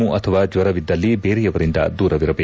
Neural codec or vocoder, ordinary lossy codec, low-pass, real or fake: none; none; none; real